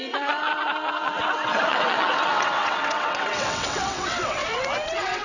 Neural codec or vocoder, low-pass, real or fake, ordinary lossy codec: vocoder, 22.05 kHz, 80 mel bands, WaveNeXt; 7.2 kHz; fake; none